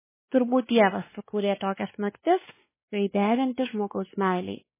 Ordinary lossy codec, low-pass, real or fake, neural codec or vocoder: MP3, 16 kbps; 3.6 kHz; fake; codec, 16 kHz, 2 kbps, X-Codec, WavLM features, trained on Multilingual LibriSpeech